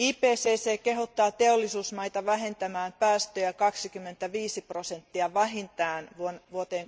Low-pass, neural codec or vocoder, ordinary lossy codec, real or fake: none; none; none; real